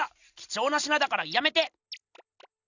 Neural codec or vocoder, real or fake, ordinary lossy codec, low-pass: none; real; none; 7.2 kHz